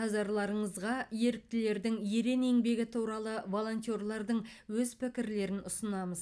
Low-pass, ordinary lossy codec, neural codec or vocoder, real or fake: none; none; none; real